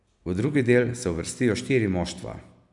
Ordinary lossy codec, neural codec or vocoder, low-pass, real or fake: none; none; 10.8 kHz; real